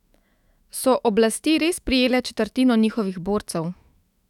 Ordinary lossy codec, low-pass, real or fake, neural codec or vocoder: none; 19.8 kHz; fake; autoencoder, 48 kHz, 128 numbers a frame, DAC-VAE, trained on Japanese speech